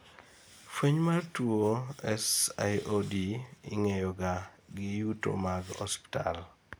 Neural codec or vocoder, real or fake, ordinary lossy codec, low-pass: none; real; none; none